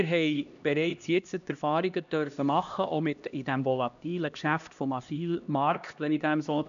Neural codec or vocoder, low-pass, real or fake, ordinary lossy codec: codec, 16 kHz, 1 kbps, X-Codec, HuBERT features, trained on LibriSpeech; 7.2 kHz; fake; none